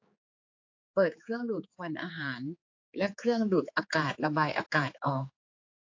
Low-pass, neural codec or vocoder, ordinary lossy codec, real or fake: 7.2 kHz; codec, 16 kHz, 4 kbps, X-Codec, HuBERT features, trained on general audio; AAC, 48 kbps; fake